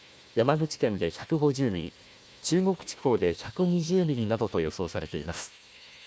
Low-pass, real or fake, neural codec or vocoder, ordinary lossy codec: none; fake; codec, 16 kHz, 1 kbps, FunCodec, trained on Chinese and English, 50 frames a second; none